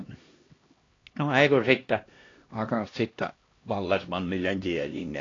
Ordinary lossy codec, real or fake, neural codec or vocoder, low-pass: AAC, 32 kbps; fake; codec, 16 kHz, 1 kbps, X-Codec, HuBERT features, trained on LibriSpeech; 7.2 kHz